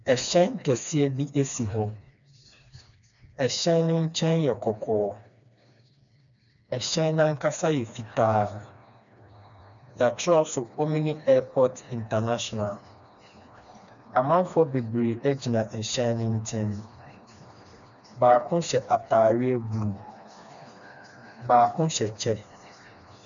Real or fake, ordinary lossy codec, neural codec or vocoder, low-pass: fake; MP3, 96 kbps; codec, 16 kHz, 2 kbps, FreqCodec, smaller model; 7.2 kHz